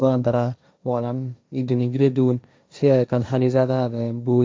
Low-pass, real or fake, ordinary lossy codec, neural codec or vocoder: none; fake; none; codec, 16 kHz, 1.1 kbps, Voila-Tokenizer